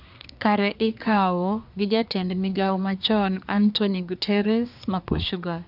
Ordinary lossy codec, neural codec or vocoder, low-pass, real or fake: none; codec, 24 kHz, 1 kbps, SNAC; 5.4 kHz; fake